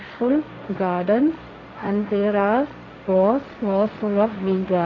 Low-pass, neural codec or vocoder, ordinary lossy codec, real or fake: 7.2 kHz; codec, 16 kHz, 1.1 kbps, Voila-Tokenizer; AAC, 32 kbps; fake